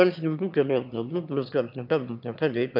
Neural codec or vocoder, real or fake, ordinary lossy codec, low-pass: autoencoder, 22.05 kHz, a latent of 192 numbers a frame, VITS, trained on one speaker; fake; none; 5.4 kHz